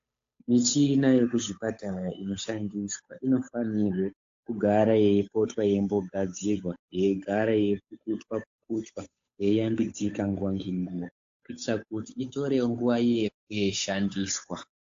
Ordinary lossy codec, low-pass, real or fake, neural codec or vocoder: AAC, 48 kbps; 7.2 kHz; fake; codec, 16 kHz, 8 kbps, FunCodec, trained on Chinese and English, 25 frames a second